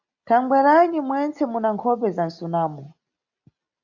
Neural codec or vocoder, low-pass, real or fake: none; 7.2 kHz; real